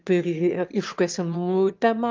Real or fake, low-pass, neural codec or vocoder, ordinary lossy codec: fake; 7.2 kHz; autoencoder, 22.05 kHz, a latent of 192 numbers a frame, VITS, trained on one speaker; Opus, 24 kbps